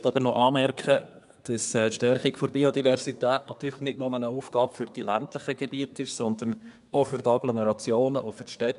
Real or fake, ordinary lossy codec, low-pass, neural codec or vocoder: fake; none; 10.8 kHz; codec, 24 kHz, 1 kbps, SNAC